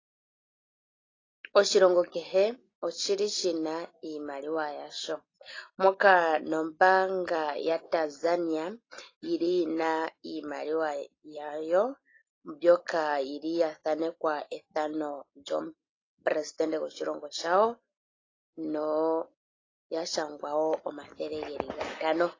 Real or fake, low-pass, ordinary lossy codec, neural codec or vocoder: real; 7.2 kHz; AAC, 32 kbps; none